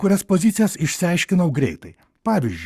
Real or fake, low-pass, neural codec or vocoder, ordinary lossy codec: fake; 14.4 kHz; codec, 44.1 kHz, 7.8 kbps, Pupu-Codec; Opus, 64 kbps